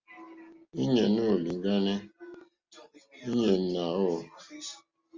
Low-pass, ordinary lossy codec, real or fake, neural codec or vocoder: 7.2 kHz; Opus, 32 kbps; real; none